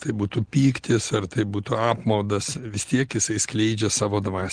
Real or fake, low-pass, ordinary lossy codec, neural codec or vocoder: real; 9.9 kHz; Opus, 16 kbps; none